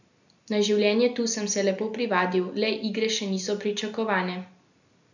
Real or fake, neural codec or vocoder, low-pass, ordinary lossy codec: real; none; 7.2 kHz; none